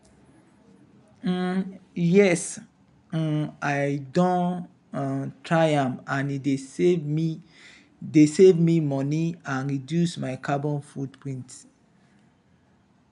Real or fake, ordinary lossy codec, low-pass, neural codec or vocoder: real; none; 10.8 kHz; none